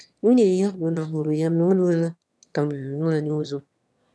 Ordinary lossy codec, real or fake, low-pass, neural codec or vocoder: none; fake; none; autoencoder, 22.05 kHz, a latent of 192 numbers a frame, VITS, trained on one speaker